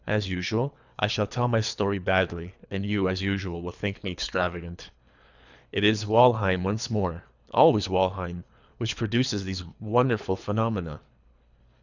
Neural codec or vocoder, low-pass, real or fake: codec, 24 kHz, 3 kbps, HILCodec; 7.2 kHz; fake